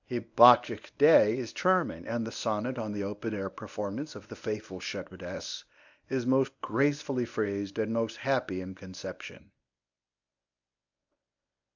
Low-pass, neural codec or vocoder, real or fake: 7.2 kHz; codec, 24 kHz, 0.9 kbps, WavTokenizer, medium speech release version 1; fake